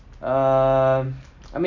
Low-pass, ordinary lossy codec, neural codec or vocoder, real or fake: 7.2 kHz; none; none; real